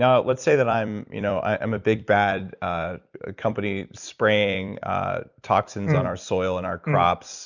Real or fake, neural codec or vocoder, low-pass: fake; vocoder, 44.1 kHz, 128 mel bands every 256 samples, BigVGAN v2; 7.2 kHz